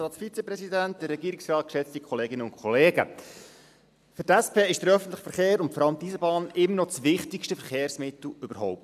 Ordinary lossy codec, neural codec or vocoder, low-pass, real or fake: none; none; 14.4 kHz; real